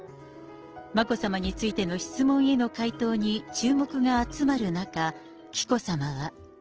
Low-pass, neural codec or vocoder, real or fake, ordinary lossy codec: 7.2 kHz; none; real; Opus, 16 kbps